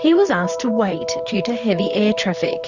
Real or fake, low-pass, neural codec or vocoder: fake; 7.2 kHz; vocoder, 44.1 kHz, 128 mel bands, Pupu-Vocoder